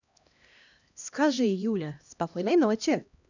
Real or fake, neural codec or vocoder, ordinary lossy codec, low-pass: fake; codec, 16 kHz, 1 kbps, X-Codec, HuBERT features, trained on LibriSpeech; none; 7.2 kHz